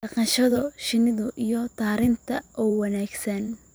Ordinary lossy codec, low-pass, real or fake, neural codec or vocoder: none; none; fake; vocoder, 44.1 kHz, 128 mel bands every 512 samples, BigVGAN v2